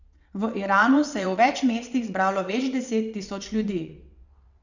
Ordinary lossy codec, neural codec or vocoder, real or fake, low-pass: none; vocoder, 22.05 kHz, 80 mel bands, WaveNeXt; fake; 7.2 kHz